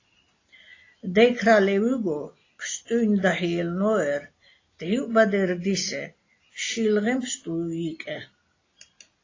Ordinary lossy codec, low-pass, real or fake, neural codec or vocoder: AAC, 32 kbps; 7.2 kHz; real; none